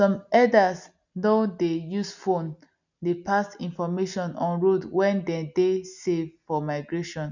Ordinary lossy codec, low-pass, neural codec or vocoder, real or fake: none; 7.2 kHz; none; real